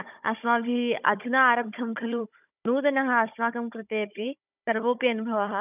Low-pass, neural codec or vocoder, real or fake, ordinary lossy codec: 3.6 kHz; codec, 16 kHz, 16 kbps, FunCodec, trained on Chinese and English, 50 frames a second; fake; none